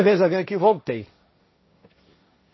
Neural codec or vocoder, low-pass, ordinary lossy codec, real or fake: codec, 16 kHz, 1.1 kbps, Voila-Tokenizer; 7.2 kHz; MP3, 24 kbps; fake